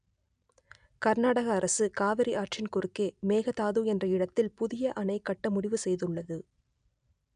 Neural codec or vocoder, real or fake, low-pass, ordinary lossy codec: none; real; 9.9 kHz; none